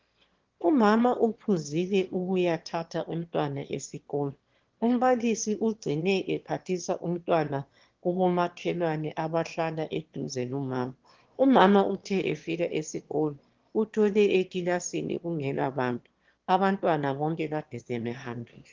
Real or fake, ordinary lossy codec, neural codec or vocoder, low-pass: fake; Opus, 16 kbps; autoencoder, 22.05 kHz, a latent of 192 numbers a frame, VITS, trained on one speaker; 7.2 kHz